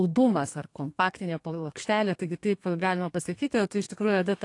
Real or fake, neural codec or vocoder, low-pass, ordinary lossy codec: fake; codec, 32 kHz, 1.9 kbps, SNAC; 10.8 kHz; AAC, 48 kbps